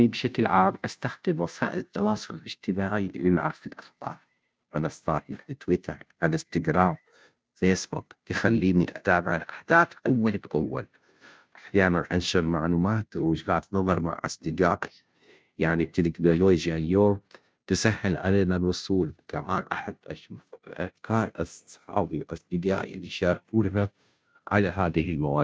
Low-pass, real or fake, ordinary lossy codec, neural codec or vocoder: none; fake; none; codec, 16 kHz, 0.5 kbps, FunCodec, trained on Chinese and English, 25 frames a second